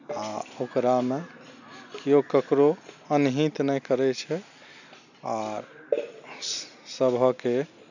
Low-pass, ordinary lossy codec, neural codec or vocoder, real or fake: 7.2 kHz; none; none; real